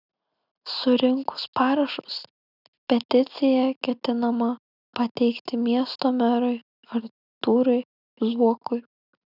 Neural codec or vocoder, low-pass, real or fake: none; 5.4 kHz; real